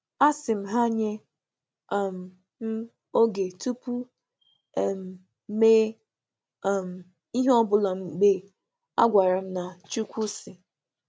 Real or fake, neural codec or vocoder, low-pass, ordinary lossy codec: real; none; none; none